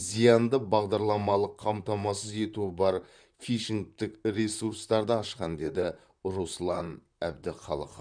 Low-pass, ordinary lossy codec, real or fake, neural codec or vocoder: 9.9 kHz; none; fake; vocoder, 44.1 kHz, 128 mel bands, Pupu-Vocoder